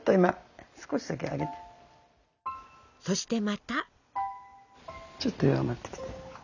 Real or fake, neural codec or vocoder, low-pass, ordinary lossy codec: real; none; 7.2 kHz; none